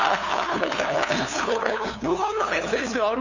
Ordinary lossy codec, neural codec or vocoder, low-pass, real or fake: none; codec, 16 kHz, 2 kbps, FunCodec, trained on LibriTTS, 25 frames a second; 7.2 kHz; fake